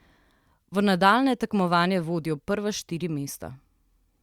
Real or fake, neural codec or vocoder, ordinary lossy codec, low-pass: real; none; Opus, 64 kbps; 19.8 kHz